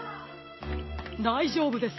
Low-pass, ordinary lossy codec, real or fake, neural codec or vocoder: 7.2 kHz; MP3, 24 kbps; real; none